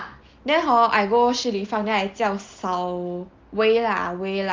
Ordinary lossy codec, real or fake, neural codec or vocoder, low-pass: Opus, 32 kbps; real; none; 7.2 kHz